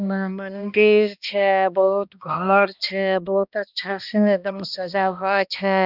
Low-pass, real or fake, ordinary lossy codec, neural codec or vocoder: 5.4 kHz; fake; none; codec, 16 kHz, 1 kbps, X-Codec, HuBERT features, trained on balanced general audio